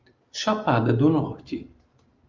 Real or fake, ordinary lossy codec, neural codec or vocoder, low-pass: real; Opus, 32 kbps; none; 7.2 kHz